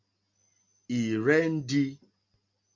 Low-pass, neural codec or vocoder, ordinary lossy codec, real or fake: 7.2 kHz; none; MP3, 64 kbps; real